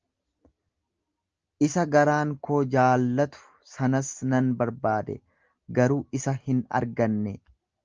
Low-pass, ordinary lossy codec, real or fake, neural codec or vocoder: 7.2 kHz; Opus, 24 kbps; real; none